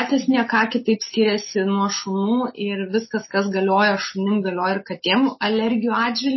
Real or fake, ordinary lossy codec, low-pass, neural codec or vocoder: real; MP3, 24 kbps; 7.2 kHz; none